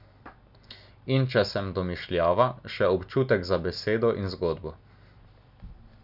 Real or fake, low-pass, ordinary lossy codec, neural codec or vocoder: real; 5.4 kHz; none; none